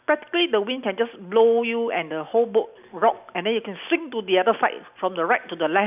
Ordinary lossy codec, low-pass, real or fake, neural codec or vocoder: none; 3.6 kHz; real; none